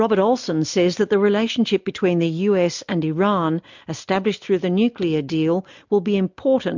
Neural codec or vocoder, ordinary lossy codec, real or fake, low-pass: none; MP3, 64 kbps; real; 7.2 kHz